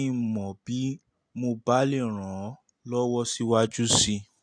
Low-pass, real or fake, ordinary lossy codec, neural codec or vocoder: 9.9 kHz; real; AAC, 64 kbps; none